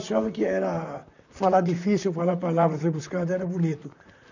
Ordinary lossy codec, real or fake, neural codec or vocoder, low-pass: none; fake; vocoder, 44.1 kHz, 128 mel bands, Pupu-Vocoder; 7.2 kHz